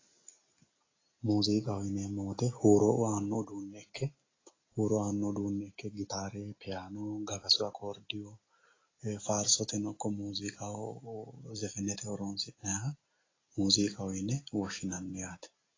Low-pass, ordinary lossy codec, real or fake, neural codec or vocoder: 7.2 kHz; AAC, 32 kbps; real; none